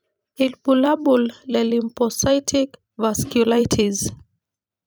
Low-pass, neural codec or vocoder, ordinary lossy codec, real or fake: none; vocoder, 44.1 kHz, 128 mel bands every 256 samples, BigVGAN v2; none; fake